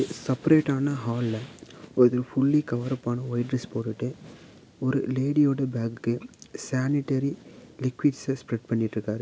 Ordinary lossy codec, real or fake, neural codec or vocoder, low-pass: none; real; none; none